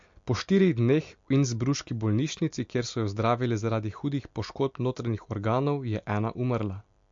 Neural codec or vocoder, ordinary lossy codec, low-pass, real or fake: none; MP3, 48 kbps; 7.2 kHz; real